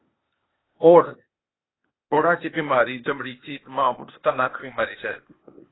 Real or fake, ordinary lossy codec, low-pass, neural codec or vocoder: fake; AAC, 16 kbps; 7.2 kHz; codec, 16 kHz, 0.8 kbps, ZipCodec